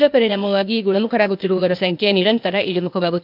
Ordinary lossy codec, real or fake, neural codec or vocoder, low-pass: MP3, 48 kbps; fake; codec, 16 kHz, 0.8 kbps, ZipCodec; 5.4 kHz